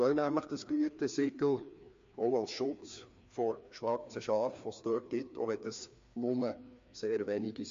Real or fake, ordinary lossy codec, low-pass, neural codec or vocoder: fake; MP3, 48 kbps; 7.2 kHz; codec, 16 kHz, 2 kbps, FreqCodec, larger model